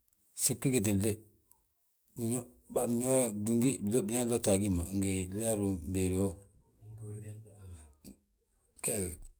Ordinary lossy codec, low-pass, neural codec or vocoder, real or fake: none; none; codec, 44.1 kHz, 7.8 kbps, DAC; fake